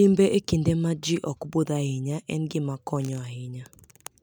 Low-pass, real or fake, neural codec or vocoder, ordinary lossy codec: 19.8 kHz; real; none; none